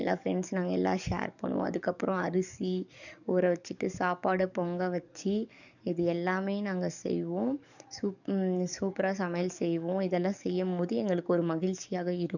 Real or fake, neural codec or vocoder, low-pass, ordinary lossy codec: fake; codec, 44.1 kHz, 7.8 kbps, DAC; 7.2 kHz; none